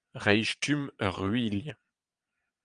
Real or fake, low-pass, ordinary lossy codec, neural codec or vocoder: fake; 9.9 kHz; Opus, 32 kbps; vocoder, 22.05 kHz, 80 mel bands, Vocos